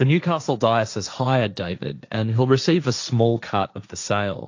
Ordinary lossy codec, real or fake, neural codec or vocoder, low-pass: AAC, 48 kbps; fake; codec, 16 kHz, 1.1 kbps, Voila-Tokenizer; 7.2 kHz